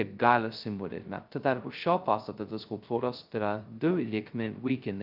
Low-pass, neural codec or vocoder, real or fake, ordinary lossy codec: 5.4 kHz; codec, 16 kHz, 0.2 kbps, FocalCodec; fake; Opus, 24 kbps